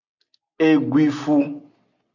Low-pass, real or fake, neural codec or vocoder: 7.2 kHz; real; none